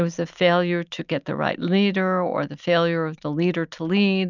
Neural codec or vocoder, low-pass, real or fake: none; 7.2 kHz; real